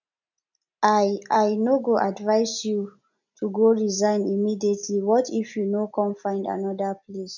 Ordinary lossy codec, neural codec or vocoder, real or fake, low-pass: none; none; real; 7.2 kHz